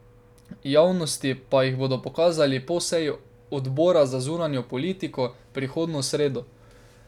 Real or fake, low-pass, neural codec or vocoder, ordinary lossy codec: real; 19.8 kHz; none; none